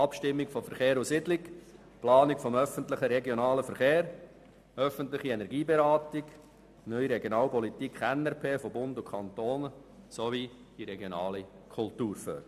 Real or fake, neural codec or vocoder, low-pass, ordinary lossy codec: real; none; 14.4 kHz; MP3, 96 kbps